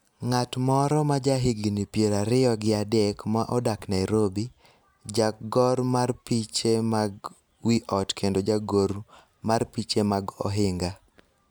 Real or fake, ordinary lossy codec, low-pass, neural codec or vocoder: real; none; none; none